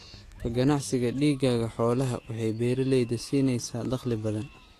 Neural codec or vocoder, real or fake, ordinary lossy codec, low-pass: autoencoder, 48 kHz, 128 numbers a frame, DAC-VAE, trained on Japanese speech; fake; AAC, 48 kbps; 14.4 kHz